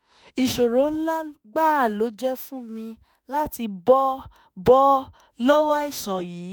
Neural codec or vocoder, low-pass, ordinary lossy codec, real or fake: autoencoder, 48 kHz, 32 numbers a frame, DAC-VAE, trained on Japanese speech; none; none; fake